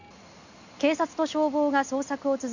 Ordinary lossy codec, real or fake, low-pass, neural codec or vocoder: none; real; 7.2 kHz; none